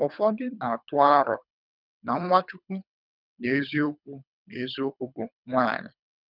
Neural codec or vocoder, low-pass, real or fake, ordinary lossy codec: codec, 24 kHz, 3 kbps, HILCodec; 5.4 kHz; fake; none